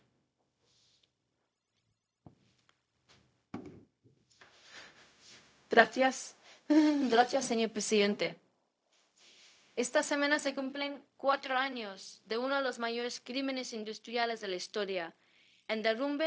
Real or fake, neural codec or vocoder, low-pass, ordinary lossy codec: fake; codec, 16 kHz, 0.4 kbps, LongCat-Audio-Codec; none; none